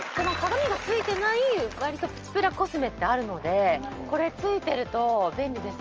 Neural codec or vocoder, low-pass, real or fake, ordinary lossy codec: none; 7.2 kHz; real; Opus, 24 kbps